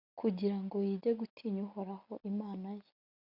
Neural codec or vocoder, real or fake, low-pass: none; real; 5.4 kHz